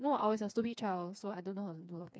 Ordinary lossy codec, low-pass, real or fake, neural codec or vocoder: none; none; fake; codec, 16 kHz, 4 kbps, FreqCodec, smaller model